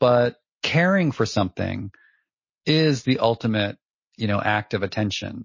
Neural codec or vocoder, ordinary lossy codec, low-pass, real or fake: none; MP3, 32 kbps; 7.2 kHz; real